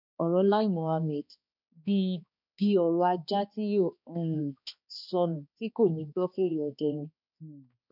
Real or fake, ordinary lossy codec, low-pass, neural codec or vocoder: fake; none; 5.4 kHz; codec, 16 kHz, 2 kbps, X-Codec, HuBERT features, trained on balanced general audio